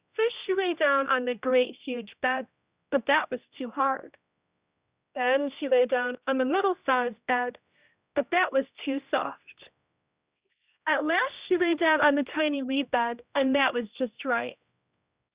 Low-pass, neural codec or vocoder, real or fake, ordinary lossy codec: 3.6 kHz; codec, 16 kHz, 1 kbps, X-Codec, HuBERT features, trained on general audio; fake; Opus, 64 kbps